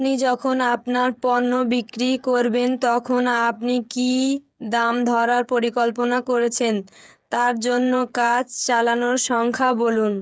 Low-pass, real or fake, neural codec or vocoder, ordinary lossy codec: none; fake; codec, 16 kHz, 8 kbps, FreqCodec, smaller model; none